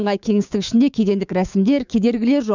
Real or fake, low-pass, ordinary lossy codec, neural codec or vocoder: fake; 7.2 kHz; none; codec, 16 kHz, 6 kbps, DAC